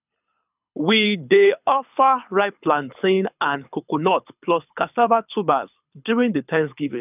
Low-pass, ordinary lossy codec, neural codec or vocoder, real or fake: 3.6 kHz; none; codec, 24 kHz, 6 kbps, HILCodec; fake